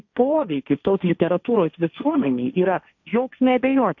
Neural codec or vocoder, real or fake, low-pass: codec, 16 kHz, 1.1 kbps, Voila-Tokenizer; fake; 7.2 kHz